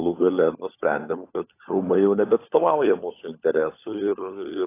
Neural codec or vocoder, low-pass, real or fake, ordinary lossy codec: codec, 16 kHz, 16 kbps, FunCodec, trained on LibriTTS, 50 frames a second; 3.6 kHz; fake; AAC, 24 kbps